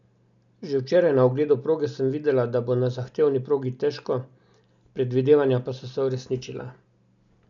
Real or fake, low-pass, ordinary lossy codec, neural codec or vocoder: real; 7.2 kHz; MP3, 96 kbps; none